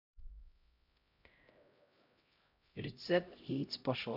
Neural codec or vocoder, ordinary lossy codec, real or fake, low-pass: codec, 16 kHz, 0.5 kbps, X-Codec, HuBERT features, trained on LibriSpeech; none; fake; 5.4 kHz